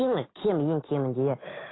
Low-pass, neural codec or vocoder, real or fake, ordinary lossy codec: 7.2 kHz; autoencoder, 48 kHz, 128 numbers a frame, DAC-VAE, trained on Japanese speech; fake; AAC, 16 kbps